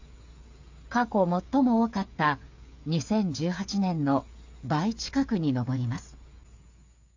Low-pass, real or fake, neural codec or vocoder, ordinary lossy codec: 7.2 kHz; fake; codec, 16 kHz, 8 kbps, FreqCodec, smaller model; AAC, 48 kbps